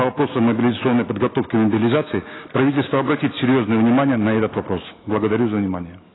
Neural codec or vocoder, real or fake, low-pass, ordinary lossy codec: none; real; 7.2 kHz; AAC, 16 kbps